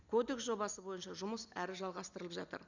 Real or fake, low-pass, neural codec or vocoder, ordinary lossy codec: real; 7.2 kHz; none; none